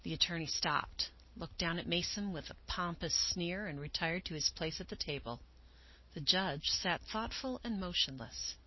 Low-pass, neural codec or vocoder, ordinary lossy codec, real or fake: 7.2 kHz; none; MP3, 24 kbps; real